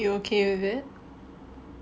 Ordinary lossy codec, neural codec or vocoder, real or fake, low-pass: none; none; real; none